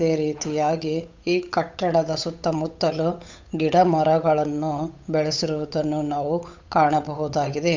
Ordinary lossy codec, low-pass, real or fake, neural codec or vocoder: MP3, 64 kbps; 7.2 kHz; fake; codec, 16 kHz, 8 kbps, FunCodec, trained on Chinese and English, 25 frames a second